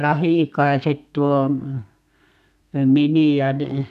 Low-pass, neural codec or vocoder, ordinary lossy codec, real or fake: 14.4 kHz; codec, 32 kHz, 1.9 kbps, SNAC; none; fake